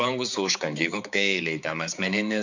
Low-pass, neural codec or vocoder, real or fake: 7.2 kHz; codec, 16 kHz, 4 kbps, X-Codec, HuBERT features, trained on balanced general audio; fake